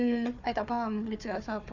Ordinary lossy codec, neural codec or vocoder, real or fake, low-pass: none; codec, 16 kHz, 4 kbps, FunCodec, trained on Chinese and English, 50 frames a second; fake; 7.2 kHz